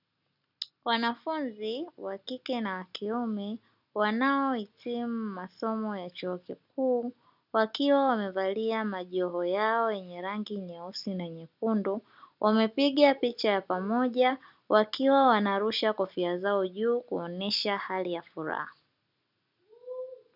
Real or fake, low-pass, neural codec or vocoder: real; 5.4 kHz; none